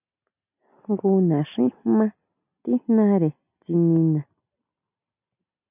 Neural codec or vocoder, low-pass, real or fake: none; 3.6 kHz; real